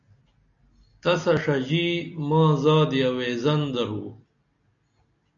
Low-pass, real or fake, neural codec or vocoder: 7.2 kHz; real; none